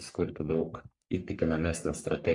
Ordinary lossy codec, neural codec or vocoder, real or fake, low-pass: MP3, 96 kbps; codec, 44.1 kHz, 3.4 kbps, Pupu-Codec; fake; 10.8 kHz